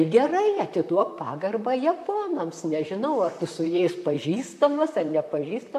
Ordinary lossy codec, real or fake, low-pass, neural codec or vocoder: MP3, 64 kbps; fake; 14.4 kHz; vocoder, 44.1 kHz, 128 mel bands, Pupu-Vocoder